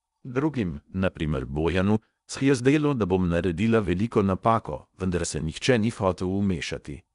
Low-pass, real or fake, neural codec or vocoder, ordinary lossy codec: 10.8 kHz; fake; codec, 16 kHz in and 24 kHz out, 0.8 kbps, FocalCodec, streaming, 65536 codes; none